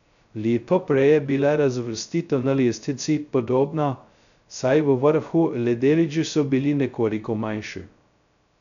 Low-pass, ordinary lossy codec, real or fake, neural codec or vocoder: 7.2 kHz; none; fake; codec, 16 kHz, 0.2 kbps, FocalCodec